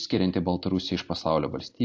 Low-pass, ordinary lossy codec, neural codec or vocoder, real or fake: 7.2 kHz; AAC, 48 kbps; none; real